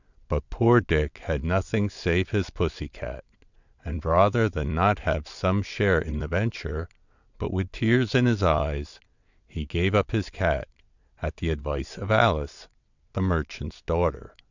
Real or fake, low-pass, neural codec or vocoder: fake; 7.2 kHz; vocoder, 22.05 kHz, 80 mel bands, WaveNeXt